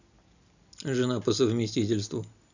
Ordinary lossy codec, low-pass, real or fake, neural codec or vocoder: none; 7.2 kHz; real; none